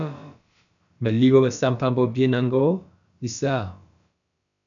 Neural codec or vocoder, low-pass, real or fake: codec, 16 kHz, about 1 kbps, DyCAST, with the encoder's durations; 7.2 kHz; fake